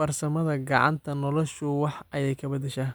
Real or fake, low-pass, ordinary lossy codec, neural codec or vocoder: real; none; none; none